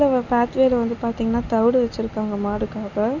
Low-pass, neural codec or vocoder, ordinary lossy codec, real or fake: 7.2 kHz; none; none; real